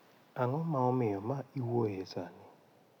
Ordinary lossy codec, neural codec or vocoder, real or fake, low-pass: none; none; real; 19.8 kHz